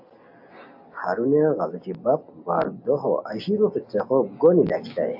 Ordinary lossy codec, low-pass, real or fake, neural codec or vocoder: AAC, 48 kbps; 5.4 kHz; real; none